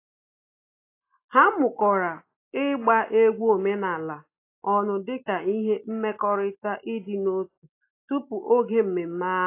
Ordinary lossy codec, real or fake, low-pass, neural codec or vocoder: AAC, 24 kbps; real; 3.6 kHz; none